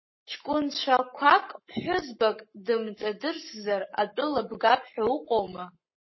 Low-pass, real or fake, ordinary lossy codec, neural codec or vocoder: 7.2 kHz; fake; MP3, 24 kbps; codec, 44.1 kHz, 7.8 kbps, Pupu-Codec